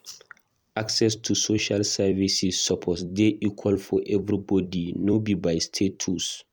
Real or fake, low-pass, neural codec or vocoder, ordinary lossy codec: fake; 19.8 kHz; vocoder, 44.1 kHz, 128 mel bands every 256 samples, BigVGAN v2; none